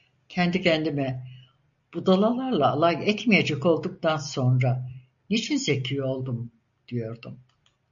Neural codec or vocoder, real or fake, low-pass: none; real; 7.2 kHz